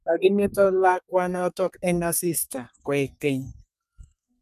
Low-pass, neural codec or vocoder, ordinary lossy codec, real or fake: 14.4 kHz; codec, 32 kHz, 1.9 kbps, SNAC; none; fake